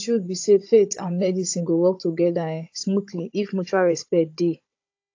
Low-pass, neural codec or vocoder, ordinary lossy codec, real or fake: 7.2 kHz; codec, 16 kHz, 16 kbps, FunCodec, trained on Chinese and English, 50 frames a second; AAC, 48 kbps; fake